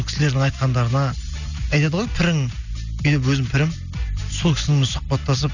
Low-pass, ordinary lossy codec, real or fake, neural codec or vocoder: 7.2 kHz; none; real; none